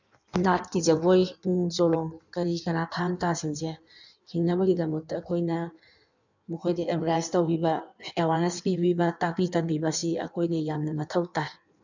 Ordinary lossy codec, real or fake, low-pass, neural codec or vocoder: none; fake; 7.2 kHz; codec, 16 kHz in and 24 kHz out, 1.1 kbps, FireRedTTS-2 codec